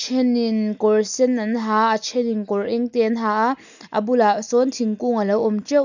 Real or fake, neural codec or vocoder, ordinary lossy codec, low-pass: real; none; none; 7.2 kHz